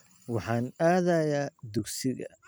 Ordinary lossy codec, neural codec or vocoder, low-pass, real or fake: none; vocoder, 44.1 kHz, 128 mel bands every 256 samples, BigVGAN v2; none; fake